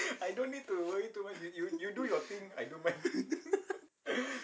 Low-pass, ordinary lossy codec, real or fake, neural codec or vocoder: none; none; real; none